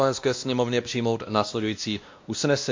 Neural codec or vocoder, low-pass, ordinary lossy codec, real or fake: codec, 16 kHz, 1 kbps, X-Codec, WavLM features, trained on Multilingual LibriSpeech; 7.2 kHz; MP3, 48 kbps; fake